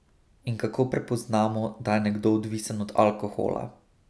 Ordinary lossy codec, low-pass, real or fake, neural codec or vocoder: none; none; real; none